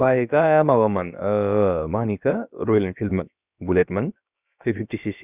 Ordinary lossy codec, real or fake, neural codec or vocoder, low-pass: Opus, 64 kbps; fake; codec, 16 kHz, about 1 kbps, DyCAST, with the encoder's durations; 3.6 kHz